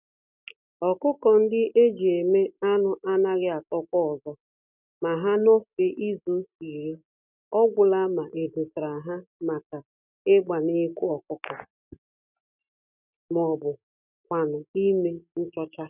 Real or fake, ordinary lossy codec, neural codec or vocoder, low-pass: real; none; none; 3.6 kHz